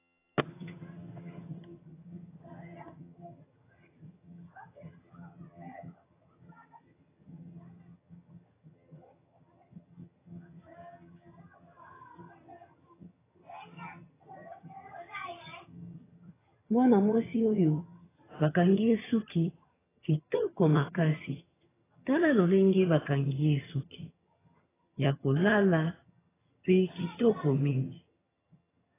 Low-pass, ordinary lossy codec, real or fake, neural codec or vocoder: 3.6 kHz; AAC, 16 kbps; fake; vocoder, 22.05 kHz, 80 mel bands, HiFi-GAN